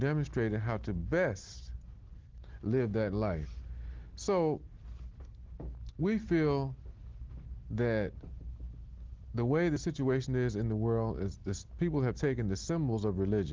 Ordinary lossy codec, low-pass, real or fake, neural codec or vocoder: Opus, 16 kbps; 7.2 kHz; real; none